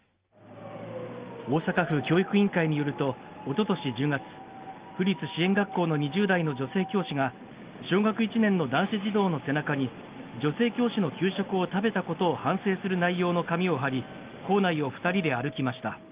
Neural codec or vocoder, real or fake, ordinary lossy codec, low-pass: none; real; Opus, 32 kbps; 3.6 kHz